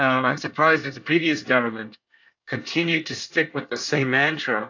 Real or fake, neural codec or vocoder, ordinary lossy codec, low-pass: fake; codec, 24 kHz, 1 kbps, SNAC; AAC, 48 kbps; 7.2 kHz